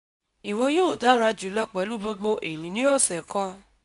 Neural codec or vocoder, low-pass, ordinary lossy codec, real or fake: codec, 24 kHz, 0.9 kbps, WavTokenizer, medium speech release version 2; 10.8 kHz; none; fake